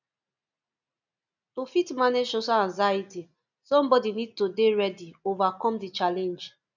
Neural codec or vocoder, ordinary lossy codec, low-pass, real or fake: none; none; 7.2 kHz; real